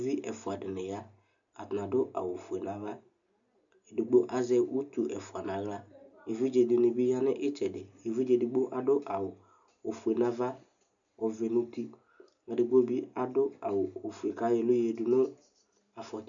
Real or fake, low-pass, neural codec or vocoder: real; 7.2 kHz; none